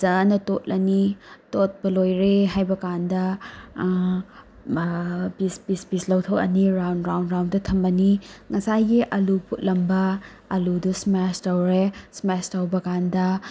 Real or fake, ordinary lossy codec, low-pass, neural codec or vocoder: real; none; none; none